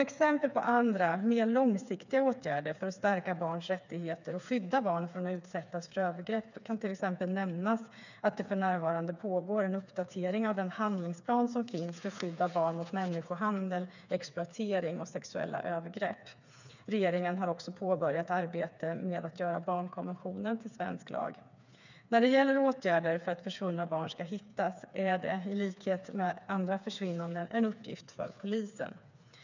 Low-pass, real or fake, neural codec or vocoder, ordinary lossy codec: 7.2 kHz; fake; codec, 16 kHz, 4 kbps, FreqCodec, smaller model; none